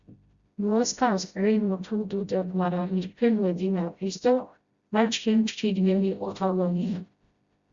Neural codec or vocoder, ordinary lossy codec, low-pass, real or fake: codec, 16 kHz, 0.5 kbps, FreqCodec, smaller model; Opus, 64 kbps; 7.2 kHz; fake